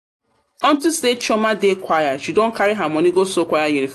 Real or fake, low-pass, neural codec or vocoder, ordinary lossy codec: real; 14.4 kHz; none; AAC, 64 kbps